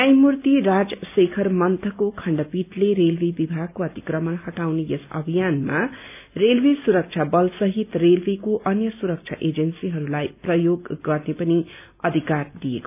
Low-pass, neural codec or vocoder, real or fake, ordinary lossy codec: 3.6 kHz; none; real; none